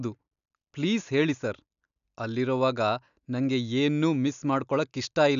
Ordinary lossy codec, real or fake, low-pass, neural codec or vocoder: none; real; 7.2 kHz; none